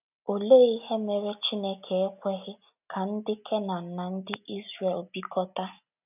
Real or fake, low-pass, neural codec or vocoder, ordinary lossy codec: real; 3.6 kHz; none; none